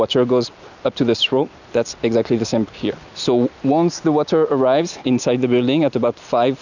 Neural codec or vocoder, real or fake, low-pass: none; real; 7.2 kHz